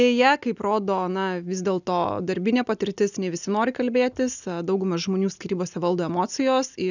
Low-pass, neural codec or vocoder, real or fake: 7.2 kHz; none; real